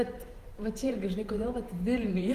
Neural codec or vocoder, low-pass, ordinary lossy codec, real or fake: vocoder, 44.1 kHz, 128 mel bands every 512 samples, BigVGAN v2; 14.4 kHz; Opus, 24 kbps; fake